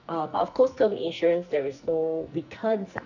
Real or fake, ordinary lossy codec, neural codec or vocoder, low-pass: fake; none; codec, 44.1 kHz, 2.6 kbps, SNAC; 7.2 kHz